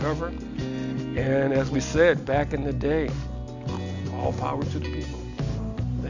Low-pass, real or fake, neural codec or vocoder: 7.2 kHz; real; none